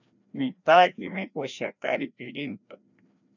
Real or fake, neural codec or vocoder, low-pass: fake; codec, 16 kHz, 1 kbps, FreqCodec, larger model; 7.2 kHz